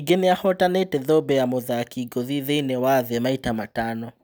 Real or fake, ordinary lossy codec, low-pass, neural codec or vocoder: real; none; none; none